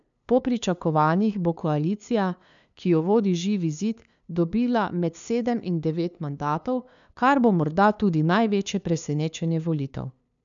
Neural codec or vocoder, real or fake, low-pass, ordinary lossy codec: codec, 16 kHz, 2 kbps, FunCodec, trained on LibriTTS, 25 frames a second; fake; 7.2 kHz; none